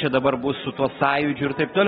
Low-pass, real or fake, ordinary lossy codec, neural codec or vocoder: 19.8 kHz; real; AAC, 16 kbps; none